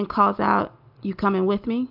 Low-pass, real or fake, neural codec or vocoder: 5.4 kHz; real; none